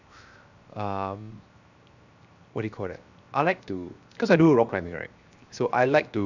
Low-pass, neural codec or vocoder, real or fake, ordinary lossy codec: 7.2 kHz; codec, 16 kHz, 0.7 kbps, FocalCodec; fake; none